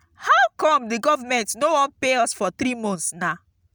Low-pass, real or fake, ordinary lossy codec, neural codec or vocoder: none; real; none; none